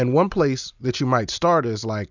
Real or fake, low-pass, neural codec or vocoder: real; 7.2 kHz; none